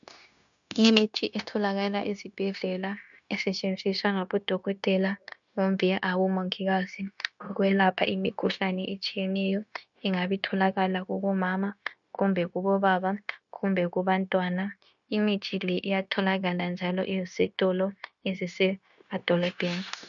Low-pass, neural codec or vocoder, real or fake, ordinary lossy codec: 7.2 kHz; codec, 16 kHz, 0.9 kbps, LongCat-Audio-Codec; fake; MP3, 96 kbps